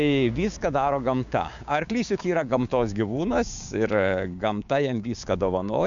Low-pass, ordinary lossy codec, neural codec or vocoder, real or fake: 7.2 kHz; MP3, 64 kbps; codec, 16 kHz, 6 kbps, DAC; fake